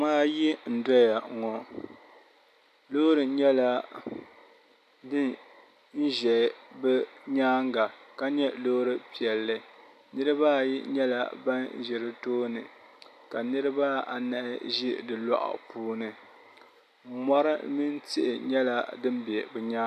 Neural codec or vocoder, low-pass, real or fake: none; 14.4 kHz; real